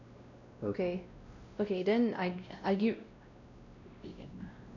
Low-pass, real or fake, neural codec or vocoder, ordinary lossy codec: 7.2 kHz; fake; codec, 16 kHz, 1 kbps, X-Codec, WavLM features, trained on Multilingual LibriSpeech; none